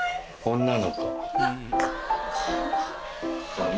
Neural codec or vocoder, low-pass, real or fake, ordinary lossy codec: none; none; real; none